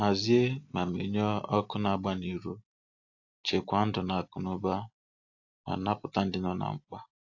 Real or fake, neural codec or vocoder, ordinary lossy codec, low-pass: real; none; AAC, 48 kbps; 7.2 kHz